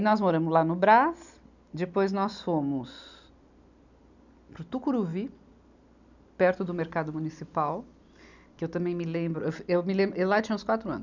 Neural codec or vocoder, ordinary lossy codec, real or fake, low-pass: none; none; real; 7.2 kHz